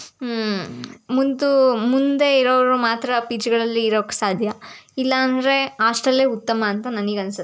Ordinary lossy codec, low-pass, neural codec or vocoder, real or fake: none; none; none; real